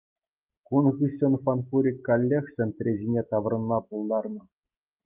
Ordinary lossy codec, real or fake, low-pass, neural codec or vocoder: Opus, 32 kbps; real; 3.6 kHz; none